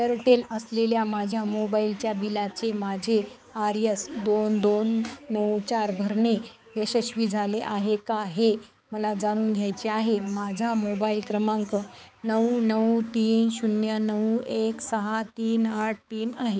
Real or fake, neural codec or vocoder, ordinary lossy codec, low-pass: fake; codec, 16 kHz, 4 kbps, X-Codec, HuBERT features, trained on balanced general audio; none; none